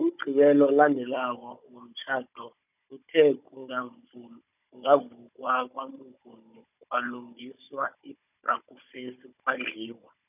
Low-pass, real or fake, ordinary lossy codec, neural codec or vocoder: 3.6 kHz; fake; none; codec, 16 kHz, 16 kbps, FunCodec, trained on Chinese and English, 50 frames a second